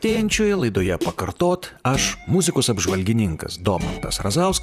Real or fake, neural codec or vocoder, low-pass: fake; vocoder, 44.1 kHz, 128 mel bands, Pupu-Vocoder; 14.4 kHz